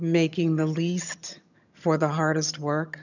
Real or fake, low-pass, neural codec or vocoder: fake; 7.2 kHz; vocoder, 22.05 kHz, 80 mel bands, HiFi-GAN